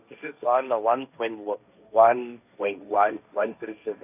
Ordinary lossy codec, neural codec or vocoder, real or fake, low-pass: none; codec, 16 kHz, 1.1 kbps, Voila-Tokenizer; fake; 3.6 kHz